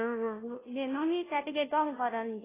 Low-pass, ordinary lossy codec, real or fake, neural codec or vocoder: 3.6 kHz; AAC, 16 kbps; fake; codec, 16 kHz, 0.5 kbps, FunCodec, trained on Chinese and English, 25 frames a second